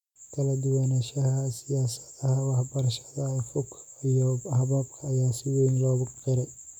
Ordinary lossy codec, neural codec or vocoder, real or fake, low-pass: none; none; real; 19.8 kHz